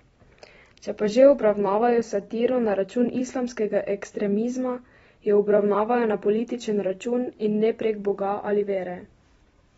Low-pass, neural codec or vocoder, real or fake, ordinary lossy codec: 19.8 kHz; vocoder, 44.1 kHz, 128 mel bands every 256 samples, BigVGAN v2; fake; AAC, 24 kbps